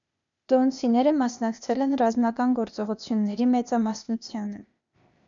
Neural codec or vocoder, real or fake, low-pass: codec, 16 kHz, 0.8 kbps, ZipCodec; fake; 7.2 kHz